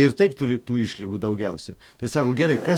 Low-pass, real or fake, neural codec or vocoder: 19.8 kHz; fake; codec, 44.1 kHz, 2.6 kbps, DAC